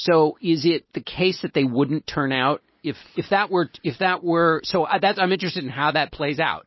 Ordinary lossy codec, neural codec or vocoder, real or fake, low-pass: MP3, 24 kbps; none; real; 7.2 kHz